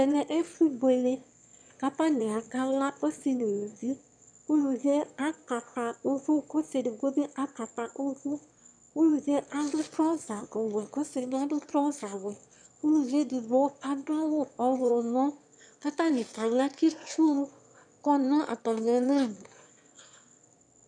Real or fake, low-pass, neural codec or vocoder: fake; 9.9 kHz; autoencoder, 22.05 kHz, a latent of 192 numbers a frame, VITS, trained on one speaker